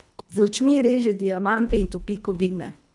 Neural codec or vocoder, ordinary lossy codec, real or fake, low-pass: codec, 24 kHz, 1.5 kbps, HILCodec; none; fake; 10.8 kHz